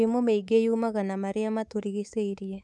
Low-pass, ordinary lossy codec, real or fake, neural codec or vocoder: none; none; fake; codec, 24 kHz, 3.1 kbps, DualCodec